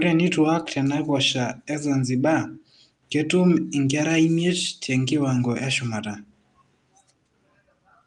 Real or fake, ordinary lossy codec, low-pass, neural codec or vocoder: real; Opus, 32 kbps; 10.8 kHz; none